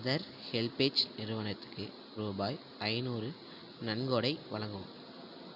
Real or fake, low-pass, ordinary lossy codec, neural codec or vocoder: real; 5.4 kHz; none; none